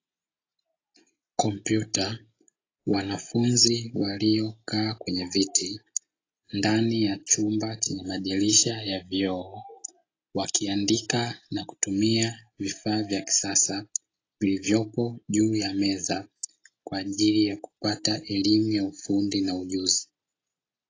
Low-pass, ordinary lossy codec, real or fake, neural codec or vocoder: 7.2 kHz; AAC, 32 kbps; real; none